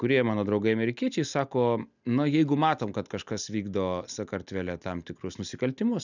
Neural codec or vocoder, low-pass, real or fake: none; 7.2 kHz; real